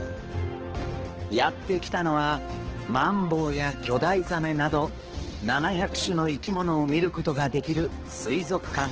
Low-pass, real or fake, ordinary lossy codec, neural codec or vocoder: 7.2 kHz; fake; Opus, 16 kbps; codec, 16 kHz, 2 kbps, X-Codec, HuBERT features, trained on balanced general audio